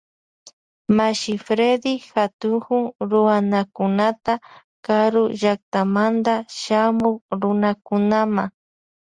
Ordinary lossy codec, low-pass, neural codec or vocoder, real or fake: Opus, 64 kbps; 9.9 kHz; none; real